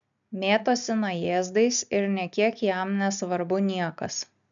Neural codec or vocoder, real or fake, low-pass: none; real; 7.2 kHz